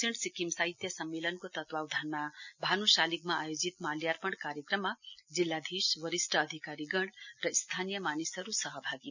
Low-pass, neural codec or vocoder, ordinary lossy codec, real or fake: 7.2 kHz; none; none; real